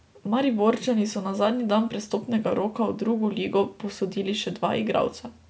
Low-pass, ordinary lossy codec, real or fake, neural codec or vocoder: none; none; real; none